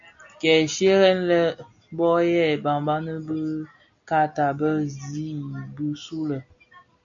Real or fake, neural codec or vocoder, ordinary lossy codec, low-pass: real; none; MP3, 64 kbps; 7.2 kHz